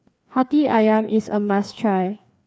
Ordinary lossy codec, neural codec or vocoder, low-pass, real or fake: none; codec, 16 kHz, 2 kbps, FreqCodec, larger model; none; fake